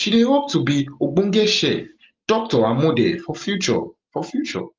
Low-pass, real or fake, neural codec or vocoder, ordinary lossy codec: 7.2 kHz; real; none; Opus, 24 kbps